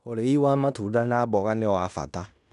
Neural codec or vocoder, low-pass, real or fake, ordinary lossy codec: codec, 16 kHz in and 24 kHz out, 0.9 kbps, LongCat-Audio-Codec, fine tuned four codebook decoder; 10.8 kHz; fake; none